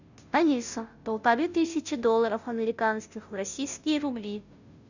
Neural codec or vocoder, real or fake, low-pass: codec, 16 kHz, 0.5 kbps, FunCodec, trained on Chinese and English, 25 frames a second; fake; 7.2 kHz